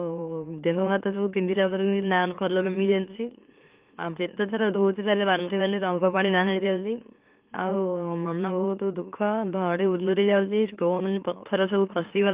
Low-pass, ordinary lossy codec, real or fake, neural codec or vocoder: 3.6 kHz; Opus, 32 kbps; fake; autoencoder, 44.1 kHz, a latent of 192 numbers a frame, MeloTTS